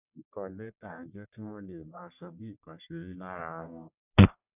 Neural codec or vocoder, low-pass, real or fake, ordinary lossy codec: codec, 44.1 kHz, 1.7 kbps, Pupu-Codec; 3.6 kHz; fake; none